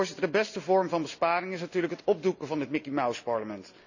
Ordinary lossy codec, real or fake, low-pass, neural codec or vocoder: none; real; 7.2 kHz; none